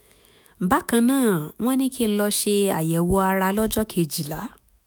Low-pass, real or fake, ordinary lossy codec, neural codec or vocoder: none; fake; none; autoencoder, 48 kHz, 128 numbers a frame, DAC-VAE, trained on Japanese speech